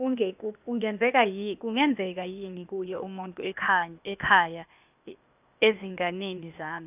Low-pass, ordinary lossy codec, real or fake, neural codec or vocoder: 3.6 kHz; none; fake; codec, 16 kHz, 0.8 kbps, ZipCodec